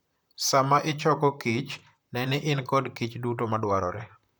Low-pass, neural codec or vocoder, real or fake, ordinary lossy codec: none; vocoder, 44.1 kHz, 128 mel bands, Pupu-Vocoder; fake; none